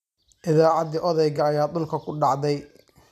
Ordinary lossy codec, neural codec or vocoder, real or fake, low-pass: none; none; real; 14.4 kHz